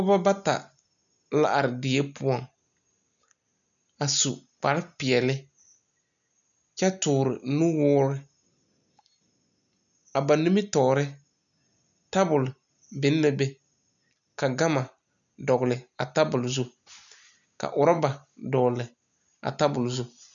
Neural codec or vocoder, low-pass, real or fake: none; 7.2 kHz; real